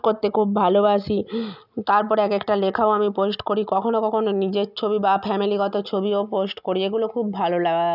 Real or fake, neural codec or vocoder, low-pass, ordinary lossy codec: real; none; 5.4 kHz; none